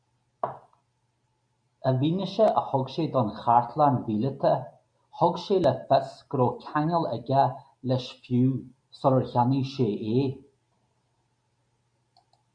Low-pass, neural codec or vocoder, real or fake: 9.9 kHz; none; real